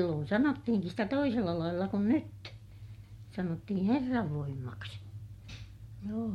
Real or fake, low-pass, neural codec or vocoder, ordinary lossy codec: real; 19.8 kHz; none; MP3, 64 kbps